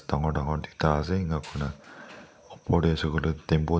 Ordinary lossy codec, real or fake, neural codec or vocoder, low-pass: none; real; none; none